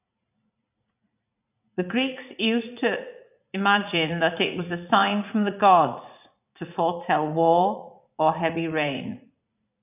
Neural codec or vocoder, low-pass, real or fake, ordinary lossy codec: vocoder, 44.1 kHz, 80 mel bands, Vocos; 3.6 kHz; fake; none